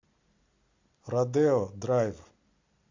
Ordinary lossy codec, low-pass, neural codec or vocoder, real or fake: AAC, 48 kbps; 7.2 kHz; none; real